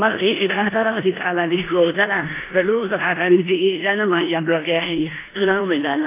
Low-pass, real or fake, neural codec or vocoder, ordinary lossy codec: 3.6 kHz; fake; codec, 16 kHz in and 24 kHz out, 0.9 kbps, LongCat-Audio-Codec, four codebook decoder; AAC, 24 kbps